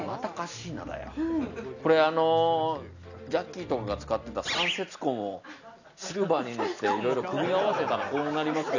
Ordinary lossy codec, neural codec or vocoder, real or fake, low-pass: none; none; real; 7.2 kHz